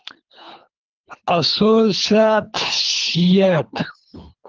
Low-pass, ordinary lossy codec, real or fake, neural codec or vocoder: 7.2 kHz; Opus, 32 kbps; fake; codec, 24 kHz, 3 kbps, HILCodec